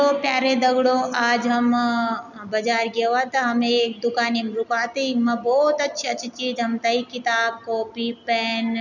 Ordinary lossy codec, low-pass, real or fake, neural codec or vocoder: none; 7.2 kHz; real; none